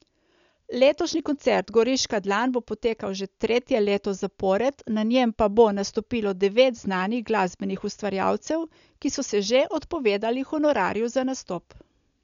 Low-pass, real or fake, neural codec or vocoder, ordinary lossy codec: 7.2 kHz; real; none; none